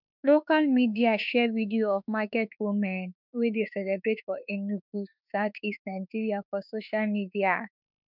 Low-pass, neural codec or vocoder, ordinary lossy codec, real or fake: 5.4 kHz; autoencoder, 48 kHz, 32 numbers a frame, DAC-VAE, trained on Japanese speech; none; fake